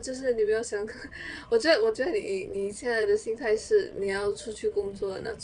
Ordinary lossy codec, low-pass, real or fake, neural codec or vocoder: none; 9.9 kHz; fake; vocoder, 22.05 kHz, 80 mel bands, Vocos